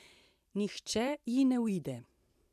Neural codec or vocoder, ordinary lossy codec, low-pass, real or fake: vocoder, 44.1 kHz, 128 mel bands every 512 samples, BigVGAN v2; none; 14.4 kHz; fake